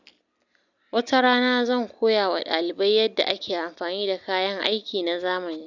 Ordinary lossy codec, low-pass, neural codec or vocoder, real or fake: none; 7.2 kHz; none; real